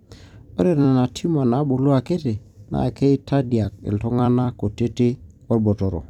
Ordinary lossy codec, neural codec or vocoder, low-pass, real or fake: none; vocoder, 44.1 kHz, 128 mel bands every 256 samples, BigVGAN v2; 19.8 kHz; fake